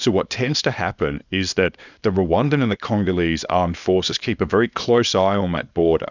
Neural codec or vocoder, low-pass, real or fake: codec, 24 kHz, 0.9 kbps, WavTokenizer, small release; 7.2 kHz; fake